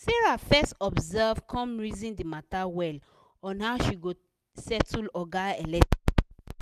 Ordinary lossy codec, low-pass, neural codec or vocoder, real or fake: none; 14.4 kHz; none; real